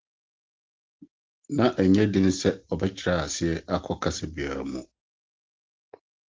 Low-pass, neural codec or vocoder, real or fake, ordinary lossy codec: 7.2 kHz; none; real; Opus, 16 kbps